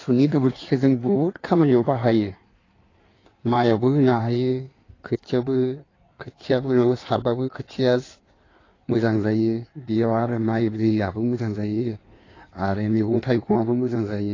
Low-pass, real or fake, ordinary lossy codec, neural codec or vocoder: 7.2 kHz; fake; AAC, 32 kbps; codec, 16 kHz in and 24 kHz out, 1.1 kbps, FireRedTTS-2 codec